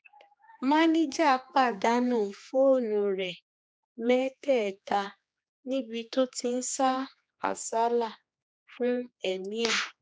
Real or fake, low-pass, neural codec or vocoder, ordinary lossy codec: fake; none; codec, 16 kHz, 2 kbps, X-Codec, HuBERT features, trained on general audio; none